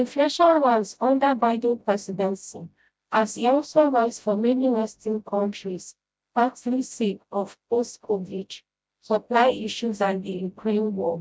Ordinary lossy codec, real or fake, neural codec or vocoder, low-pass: none; fake; codec, 16 kHz, 0.5 kbps, FreqCodec, smaller model; none